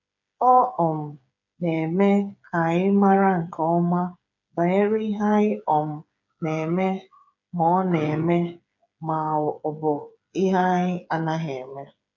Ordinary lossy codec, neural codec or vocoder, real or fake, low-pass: none; codec, 16 kHz, 8 kbps, FreqCodec, smaller model; fake; 7.2 kHz